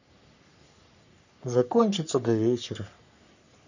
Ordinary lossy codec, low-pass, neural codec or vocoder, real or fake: none; 7.2 kHz; codec, 44.1 kHz, 3.4 kbps, Pupu-Codec; fake